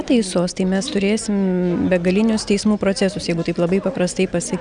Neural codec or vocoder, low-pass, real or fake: none; 9.9 kHz; real